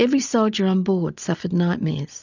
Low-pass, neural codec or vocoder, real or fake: 7.2 kHz; none; real